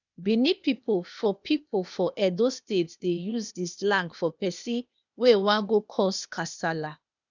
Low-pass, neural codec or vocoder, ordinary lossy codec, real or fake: 7.2 kHz; codec, 16 kHz, 0.8 kbps, ZipCodec; none; fake